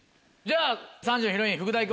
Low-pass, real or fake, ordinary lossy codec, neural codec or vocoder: none; real; none; none